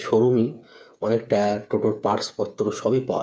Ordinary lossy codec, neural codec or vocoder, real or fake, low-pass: none; codec, 16 kHz, 8 kbps, FreqCodec, smaller model; fake; none